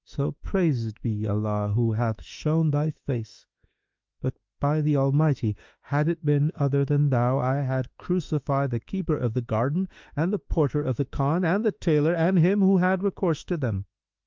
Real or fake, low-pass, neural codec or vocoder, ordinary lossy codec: fake; 7.2 kHz; autoencoder, 48 kHz, 32 numbers a frame, DAC-VAE, trained on Japanese speech; Opus, 24 kbps